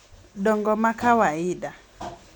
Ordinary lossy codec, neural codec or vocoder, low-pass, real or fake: none; none; 19.8 kHz; real